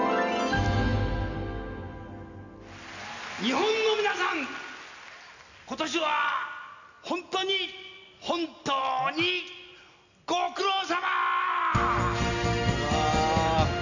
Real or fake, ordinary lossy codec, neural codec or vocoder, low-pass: real; none; none; 7.2 kHz